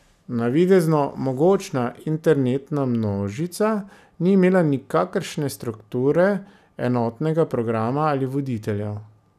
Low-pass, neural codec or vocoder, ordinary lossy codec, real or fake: 14.4 kHz; none; none; real